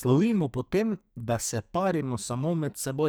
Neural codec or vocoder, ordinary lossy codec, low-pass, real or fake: codec, 44.1 kHz, 2.6 kbps, SNAC; none; none; fake